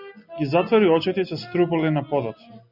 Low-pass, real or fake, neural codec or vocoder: 5.4 kHz; real; none